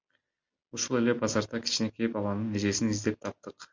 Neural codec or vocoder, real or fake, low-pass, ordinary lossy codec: none; real; 7.2 kHz; MP3, 64 kbps